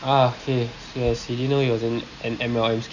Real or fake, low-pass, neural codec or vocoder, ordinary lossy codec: real; 7.2 kHz; none; none